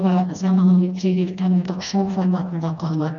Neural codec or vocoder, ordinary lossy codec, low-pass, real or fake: codec, 16 kHz, 1 kbps, FreqCodec, smaller model; none; 7.2 kHz; fake